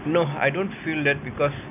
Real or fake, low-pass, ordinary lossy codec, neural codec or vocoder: real; 3.6 kHz; none; none